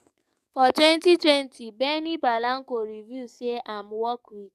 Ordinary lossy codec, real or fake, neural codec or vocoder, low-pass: none; fake; codec, 44.1 kHz, 7.8 kbps, DAC; 14.4 kHz